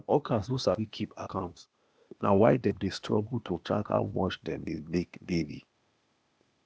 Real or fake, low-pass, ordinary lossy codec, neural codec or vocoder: fake; none; none; codec, 16 kHz, 0.8 kbps, ZipCodec